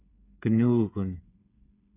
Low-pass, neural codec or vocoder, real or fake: 3.6 kHz; codec, 16 kHz, 8 kbps, FreqCodec, smaller model; fake